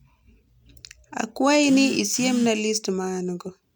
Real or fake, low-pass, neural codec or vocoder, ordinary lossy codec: real; none; none; none